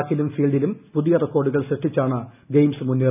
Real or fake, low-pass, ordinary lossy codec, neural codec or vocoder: real; 3.6 kHz; none; none